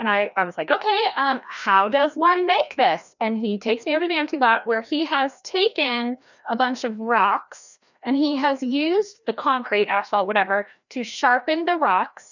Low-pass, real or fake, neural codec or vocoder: 7.2 kHz; fake; codec, 16 kHz, 1 kbps, FreqCodec, larger model